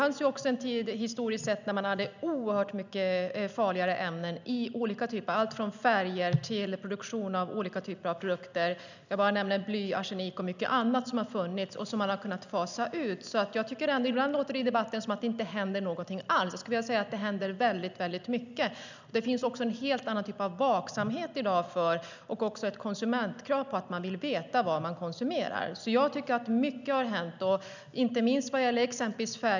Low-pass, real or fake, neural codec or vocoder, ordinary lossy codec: 7.2 kHz; real; none; none